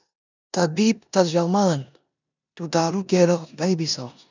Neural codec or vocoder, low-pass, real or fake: codec, 16 kHz in and 24 kHz out, 0.9 kbps, LongCat-Audio-Codec, four codebook decoder; 7.2 kHz; fake